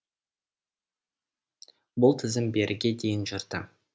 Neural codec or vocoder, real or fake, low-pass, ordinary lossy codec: none; real; none; none